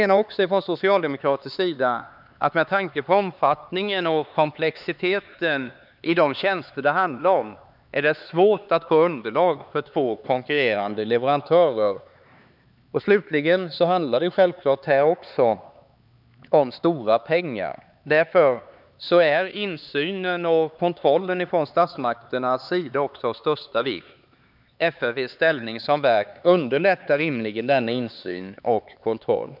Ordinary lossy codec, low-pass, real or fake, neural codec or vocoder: none; 5.4 kHz; fake; codec, 16 kHz, 4 kbps, X-Codec, HuBERT features, trained on LibriSpeech